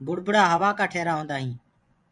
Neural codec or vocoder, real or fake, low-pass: vocoder, 24 kHz, 100 mel bands, Vocos; fake; 10.8 kHz